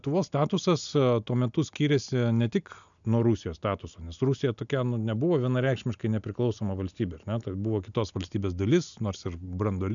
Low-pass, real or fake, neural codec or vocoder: 7.2 kHz; real; none